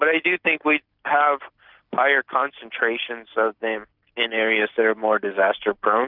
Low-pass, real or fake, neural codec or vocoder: 5.4 kHz; real; none